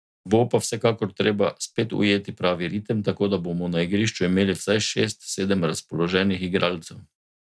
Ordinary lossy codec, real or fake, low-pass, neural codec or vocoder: none; real; none; none